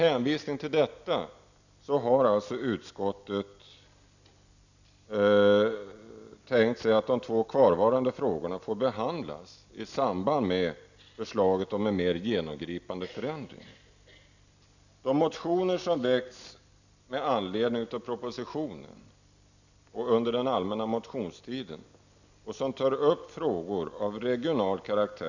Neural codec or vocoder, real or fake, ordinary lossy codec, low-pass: none; real; none; 7.2 kHz